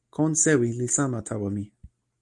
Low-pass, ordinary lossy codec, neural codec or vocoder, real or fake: 10.8 kHz; Opus, 32 kbps; none; real